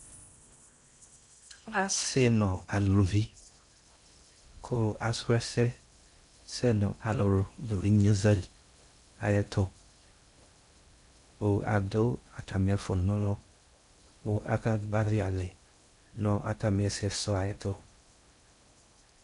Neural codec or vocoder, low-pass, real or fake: codec, 16 kHz in and 24 kHz out, 0.6 kbps, FocalCodec, streaming, 4096 codes; 10.8 kHz; fake